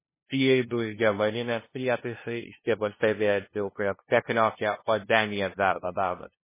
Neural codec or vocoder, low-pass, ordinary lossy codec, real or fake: codec, 16 kHz, 0.5 kbps, FunCodec, trained on LibriTTS, 25 frames a second; 3.6 kHz; MP3, 16 kbps; fake